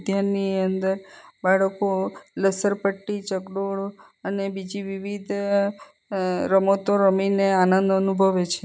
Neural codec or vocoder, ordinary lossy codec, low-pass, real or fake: none; none; none; real